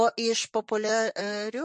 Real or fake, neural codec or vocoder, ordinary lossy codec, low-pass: fake; vocoder, 44.1 kHz, 128 mel bands, Pupu-Vocoder; MP3, 48 kbps; 10.8 kHz